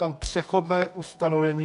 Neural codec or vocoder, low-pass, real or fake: codec, 24 kHz, 0.9 kbps, WavTokenizer, medium music audio release; 10.8 kHz; fake